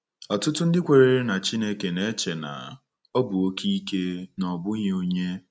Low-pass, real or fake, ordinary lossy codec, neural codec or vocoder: none; real; none; none